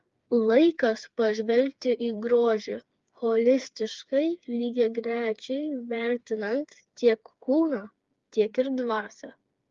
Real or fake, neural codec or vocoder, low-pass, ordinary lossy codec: fake; codec, 16 kHz, 4 kbps, FreqCodec, smaller model; 7.2 kHz; Opus, 32 kbps